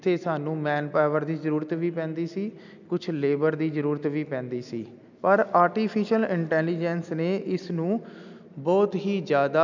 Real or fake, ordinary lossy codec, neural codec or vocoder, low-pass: real; none; none; 7.2 kHz